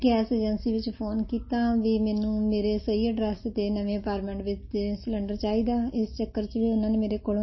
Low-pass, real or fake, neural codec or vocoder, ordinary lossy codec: 7.2 kHz; real; none; MP3, 24 kbps